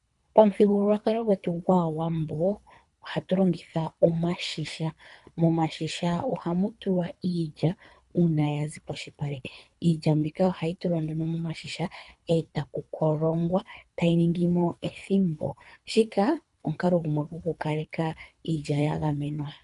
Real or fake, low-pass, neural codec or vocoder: fake; 10.8 kHz; codec, 24 kHz, 3 kbps, HILCodec